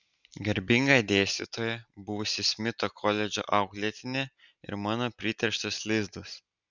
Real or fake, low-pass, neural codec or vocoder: real; 7.2 kHz; none